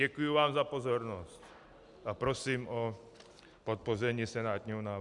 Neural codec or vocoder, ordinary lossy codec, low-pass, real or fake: none; MP3, 96 kbps; 10.8 kHz; real